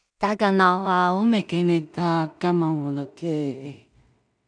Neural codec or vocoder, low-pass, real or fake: codec, 16 kHz in and 24 kHz out, 0.4 kbps, LongCat-Audio-Codec, two codebook decoder; 9.9 kHz; fake